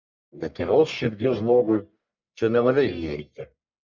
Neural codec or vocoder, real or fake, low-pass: codec, 44.1 kHz, 1.7 kbps, Pupu-Codec; fake; 7.2 kHz